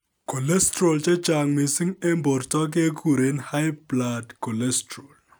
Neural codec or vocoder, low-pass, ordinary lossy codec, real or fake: none; none; none; real